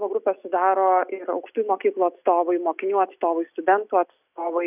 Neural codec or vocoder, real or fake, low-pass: none; real; 3.6 kHz